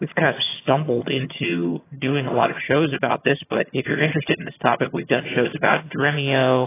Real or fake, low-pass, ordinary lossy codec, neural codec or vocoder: fake; 3.6 kHz; AAC, 16 kbps; vocoder, 22.05 kHz, 80 mel bands, HiFi-GAN